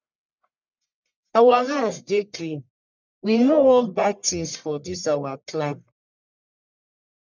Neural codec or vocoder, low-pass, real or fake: codec, 44.1 kHz, 1.7 kbps, Pupu-Codec; 7.2 kHz; fake